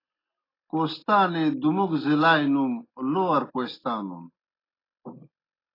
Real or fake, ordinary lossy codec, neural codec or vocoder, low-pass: real; AAC, 24 kbps; none; 5.4 kHz